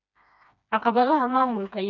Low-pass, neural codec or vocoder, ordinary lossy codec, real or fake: 7.2 kHz; codec, 16 kHz, 2 kbps, FreqCodec, smaller model; none; fake